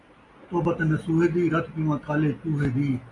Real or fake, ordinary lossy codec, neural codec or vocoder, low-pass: real; AAC, 32 kbps; none; 10.8 kHz